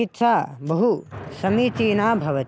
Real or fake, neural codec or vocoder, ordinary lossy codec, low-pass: real; none; none; none